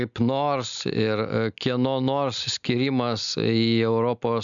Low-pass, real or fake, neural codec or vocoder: 7.2 kHz; real; none